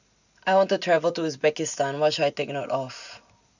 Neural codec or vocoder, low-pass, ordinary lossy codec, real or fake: none; 7.2 kHz; none; real